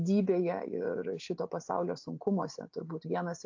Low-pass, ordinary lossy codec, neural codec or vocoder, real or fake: 7.2 kHz; AAC, 48 kbps; none; real